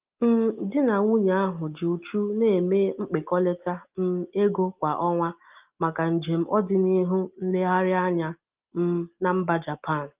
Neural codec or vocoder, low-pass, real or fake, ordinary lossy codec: none; 3.6 kHz; real; Opus, 32 kbps